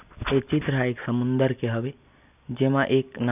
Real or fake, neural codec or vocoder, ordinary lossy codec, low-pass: real; none; none; 3.6 kHz